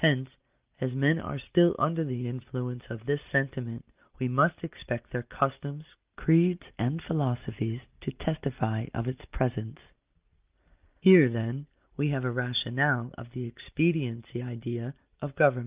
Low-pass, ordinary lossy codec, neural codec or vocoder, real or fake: 3.6 kHz; Opus, 24 kbps; none; real